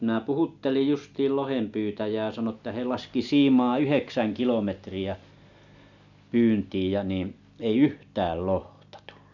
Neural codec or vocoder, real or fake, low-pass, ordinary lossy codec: none; real; 7.2 kHz; none